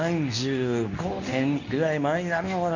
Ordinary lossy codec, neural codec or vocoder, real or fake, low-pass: none; codec, 24 kHz, 0.9 kbps, WavTokenizer, medium speech release version 2; fake; 7.2 kHz